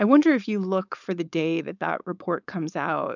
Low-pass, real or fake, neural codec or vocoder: 7.2 kHz; fake; codec, 16 kHz, 4.8 kbps, FACodec